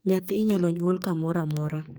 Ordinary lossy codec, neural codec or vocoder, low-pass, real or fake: none; codec, 44.1 kHz, 2.6 kbps, SNAC; none; fake